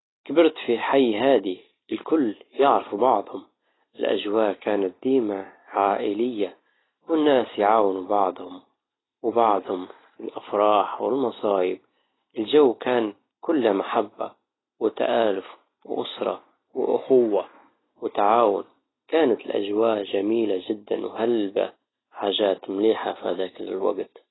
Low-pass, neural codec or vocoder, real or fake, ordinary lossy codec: 7.2 kHz; none; real; AAC, 16 kbps